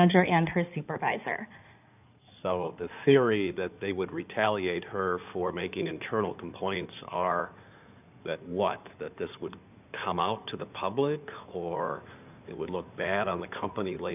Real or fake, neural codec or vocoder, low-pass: fake; codec, 16 kHz in and 24 kHz out, 2.2 kbps, FireRedTTS-2 codec; 3.6 kHz